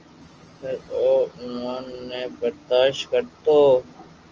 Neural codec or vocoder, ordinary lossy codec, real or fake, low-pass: none; Opus, 24 kbps; real; 7.2 kHz